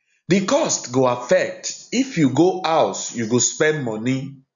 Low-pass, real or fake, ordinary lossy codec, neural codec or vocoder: 7.2 kHz; real; none; none